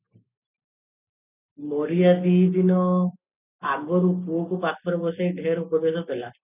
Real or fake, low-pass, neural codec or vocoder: real; 3.6 kHz; none